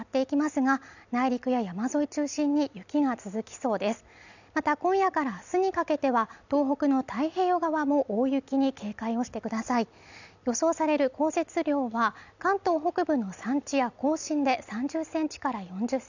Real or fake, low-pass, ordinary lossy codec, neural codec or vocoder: real; 7.2 kHz; none; none